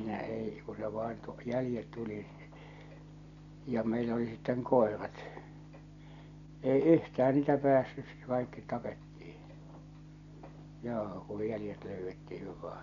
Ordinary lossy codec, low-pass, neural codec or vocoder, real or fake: none; 7.2 kHz; none; real